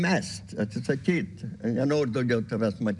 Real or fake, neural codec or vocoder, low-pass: real; none; 10.8 kHz